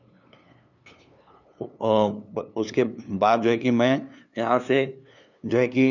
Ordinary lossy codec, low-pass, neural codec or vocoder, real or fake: none; 7.2 kHz; codec, 16 kHz, 2 kbps, FunCodec, trained on LibriTTS, 25 frames a second; fake